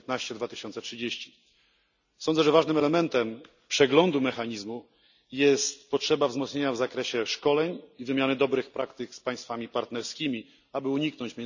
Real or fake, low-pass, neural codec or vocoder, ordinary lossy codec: real; 7.2 kHz; none; none